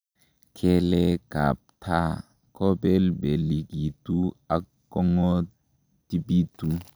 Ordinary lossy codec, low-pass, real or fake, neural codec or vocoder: none; none; real; none